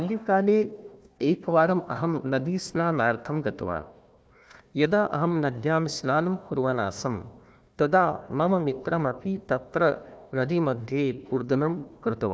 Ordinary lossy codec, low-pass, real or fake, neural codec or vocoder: none; none; fake; codec, 16 kHz, 1 kbps, FunCodec, trained on Chinese and English, 50 frames a second